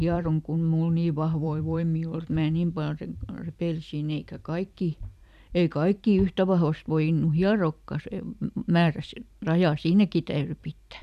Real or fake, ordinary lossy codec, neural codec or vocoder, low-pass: fake; none; vocoder, 44.1 kHz, 128 mel bands every 256 samples, BigVGAN v2; 14.4 kHz